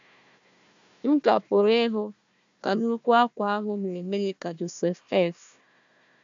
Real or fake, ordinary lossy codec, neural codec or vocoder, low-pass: fake; AAC, 64 kbps; codec, 16 kHz, 1 kbps, FunCodec, trained on Chinese and English, 50 frames a second; 7.2 kHz